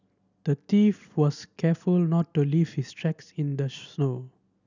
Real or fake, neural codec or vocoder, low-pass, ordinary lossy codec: real; none; 7.2 kHz; none